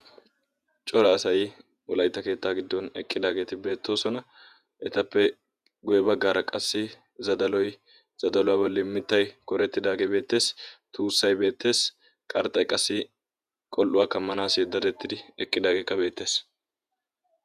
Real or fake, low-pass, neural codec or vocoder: fake; 14.4 kHz; vocoder, 48 kHz, 128 mel bands, Vocos